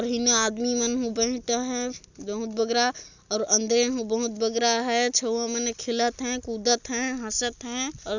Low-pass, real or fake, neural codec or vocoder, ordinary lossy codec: 7.2 kHz; real; none; none